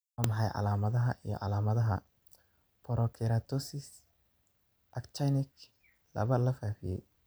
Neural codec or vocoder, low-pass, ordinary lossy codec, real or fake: vocoder, 44.1 kHz, 128 mel bands every 512 samples, BigVGAN v2; none; none; fake